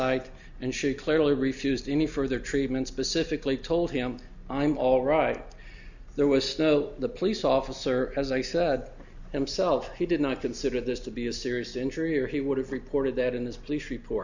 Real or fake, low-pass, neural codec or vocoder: real; 7.2 kHz; none